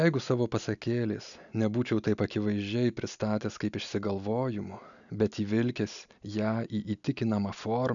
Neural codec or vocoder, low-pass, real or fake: none; 7.2 kHz; real